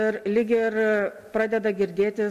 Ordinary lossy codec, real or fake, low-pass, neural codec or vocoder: MP3, 64 kbps; real; 14.4 kHz; none